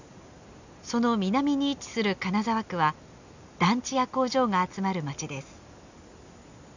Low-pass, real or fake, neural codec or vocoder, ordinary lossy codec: 7.2 kHz; real; none; none